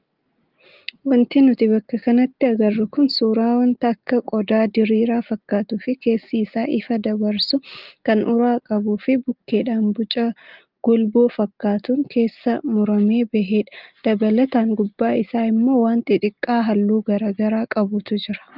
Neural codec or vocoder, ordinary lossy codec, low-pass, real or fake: none; Opus, 32 kbps; 5.4 kHz; real